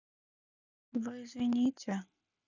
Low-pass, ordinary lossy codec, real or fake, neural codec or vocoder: 7.2 kHz; none; real; none